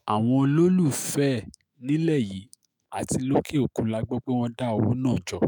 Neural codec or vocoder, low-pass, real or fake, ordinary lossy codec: autoencoder, 48 kHz, 128 numbers a frame, DAC-VAE, trained on Japanese speech; none; fake; none